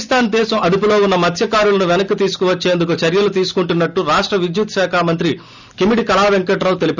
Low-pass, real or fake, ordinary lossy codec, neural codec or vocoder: 7.2 kHz; real; none; none